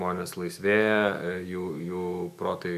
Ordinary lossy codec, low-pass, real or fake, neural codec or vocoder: AAC, 96 kbps; 14.4 kHz; fake; autoencoder, 48 kHz, 128 numbers a frame, DAC-VAE, trained on Japanese speech